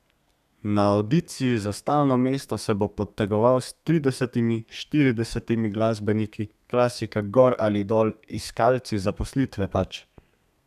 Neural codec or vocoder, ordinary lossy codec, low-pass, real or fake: codec, 32 kHz, 1.9 kbps, SNAC; none; 14.4 kHz; fake